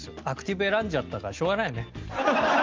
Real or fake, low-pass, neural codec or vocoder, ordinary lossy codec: real; 7.2 kHz; none; Opus, 32 kbps